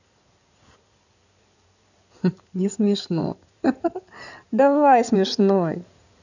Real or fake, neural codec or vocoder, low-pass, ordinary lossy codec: fake; codec, 16 kHz in and 24 kHz out, 2.2 kbps, FireRedTTS-2 codec; 7.2 kHz; none